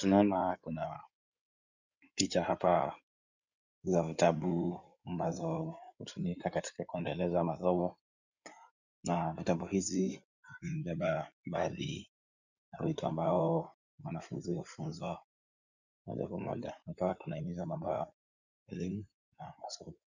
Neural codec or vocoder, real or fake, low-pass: codec, 16 kHz in and 24 kHz out, 2.2 kbps, FireRedTTS-2 codec; fake; 7.2 kHz